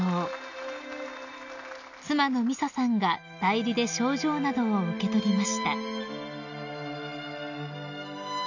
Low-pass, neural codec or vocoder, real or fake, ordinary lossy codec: 7.2 kHz; none; real; none